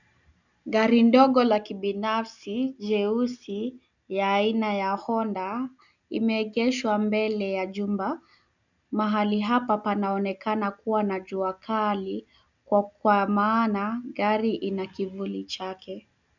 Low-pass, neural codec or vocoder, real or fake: 7.2 kHz; none; real